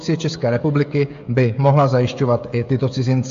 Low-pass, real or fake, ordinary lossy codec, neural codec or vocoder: 7.2 kHz; fake; AAC, 64 kbps; codec, 16 kHz, 16 kbps, FreqCodec, smaller model